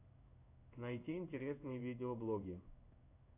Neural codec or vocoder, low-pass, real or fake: codec, 16 kHz in and 24 kHz out, 1 kbps, XY-Tokenizer; 3.6 kHz; fake